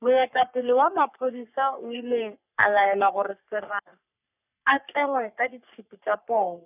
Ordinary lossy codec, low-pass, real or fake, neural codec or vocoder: none; 3.6 kHz; fake; codec, 44.1 kHz, 3.4 kbps, Pupu-Codec